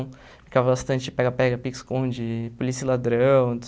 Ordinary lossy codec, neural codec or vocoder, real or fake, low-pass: none; none; real; none